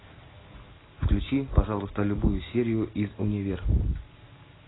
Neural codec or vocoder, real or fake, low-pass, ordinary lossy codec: none; real; 7.2 kHz; AAC, 16 kbps